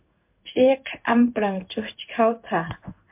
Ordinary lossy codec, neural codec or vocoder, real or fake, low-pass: MP3, 32 kbps; codec, 16 kHz in and 24 kHz out, 1 kbps, XY-Tokenizer; fake; 3.6 kHz